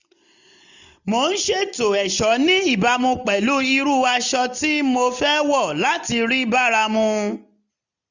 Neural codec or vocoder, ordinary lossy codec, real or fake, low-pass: none; none; real; 7.2 kHz